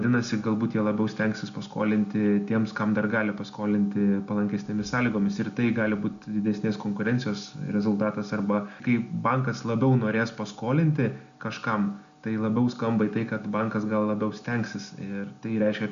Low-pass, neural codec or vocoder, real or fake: 7.2 kHz; none; real